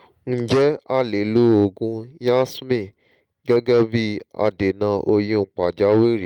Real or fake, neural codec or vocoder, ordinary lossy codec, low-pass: real; none; Opus, 32 kbps; 19.8 kHz